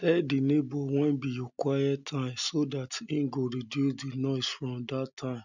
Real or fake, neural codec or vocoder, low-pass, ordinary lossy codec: real; none; 7.2 kHz; none